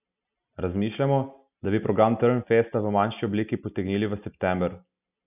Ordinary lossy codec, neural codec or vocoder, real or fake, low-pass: none; none; real; 3.6 kHz